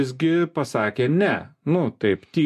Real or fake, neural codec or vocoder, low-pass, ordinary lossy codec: real; none; 14.4 kHz; MP3, 64 kbps